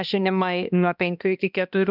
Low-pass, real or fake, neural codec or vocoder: 5.4 kHz; fake; codec, 16 kHz, 1 kbps, X-Codec, HuBERT features, trained on balanced general audio